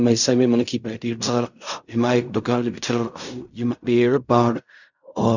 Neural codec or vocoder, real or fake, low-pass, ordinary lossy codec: codec, 16 kHz in and 24 kHz out, 0.4 kbps, LongCat-Audio-Codec, fine tuned four codebook decoder; fake; 7.2 kHz; none